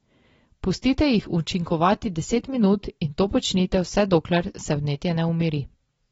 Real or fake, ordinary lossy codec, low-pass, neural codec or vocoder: real; AAC, 24 kbps; 10.8 kHz; none